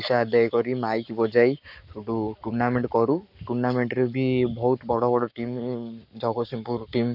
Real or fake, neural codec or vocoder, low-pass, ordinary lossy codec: fake; codec, 44.1 kHz, 7.8 kbps, Pupu-Codec; 5.4 kHz; none